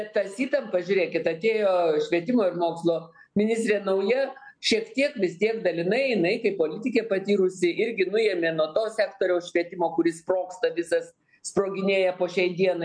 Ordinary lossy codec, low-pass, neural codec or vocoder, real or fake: MP3, 64 kbps; 9.9 kHz; none; real